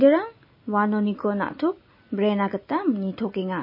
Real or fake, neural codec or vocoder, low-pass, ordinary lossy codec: real; none; 5.4 kHz; MP3, 24 kbps